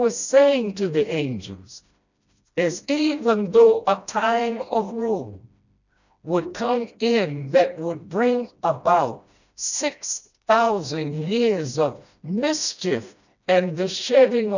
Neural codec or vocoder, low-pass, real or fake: codec, 16 kHz, 1 kbps, FreqCodec, smaller model; 7.2 kHz; fake